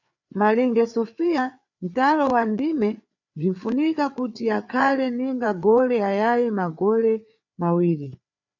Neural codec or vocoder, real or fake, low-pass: codec, 16 kHz, 4 kbps, FreqCodec, larger model; fake; 7.2 kHz